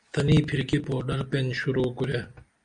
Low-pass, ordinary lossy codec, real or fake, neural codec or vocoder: 9.9 kHz; Opus, 64 kbps; real; none